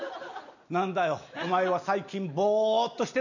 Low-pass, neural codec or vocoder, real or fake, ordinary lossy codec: 7.2 kHz; none; real; none